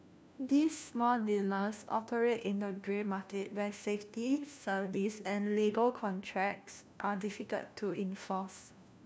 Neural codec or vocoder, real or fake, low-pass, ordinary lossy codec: codec, 16 kHz, 1 kbps, FunCodec, trained on LibriTTS, 50 frames a second; fake; none; none